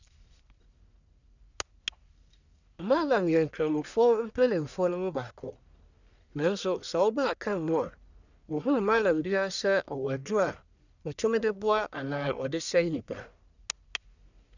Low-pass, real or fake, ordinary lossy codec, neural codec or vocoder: 7.2 kHz; fake; none; codec, 44.1 kHz, 1.7 kbps, Pupu-Codec